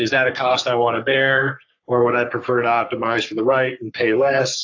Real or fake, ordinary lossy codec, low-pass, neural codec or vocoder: fake; AAC, 48 kbps; 7.2 kHz; codec, 44.1 kHz, 3.4 kbps, Pupu-Codec